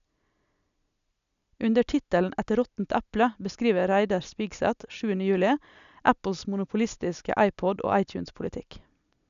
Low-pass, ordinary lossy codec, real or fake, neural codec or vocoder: 7.2 kHz; none; real; none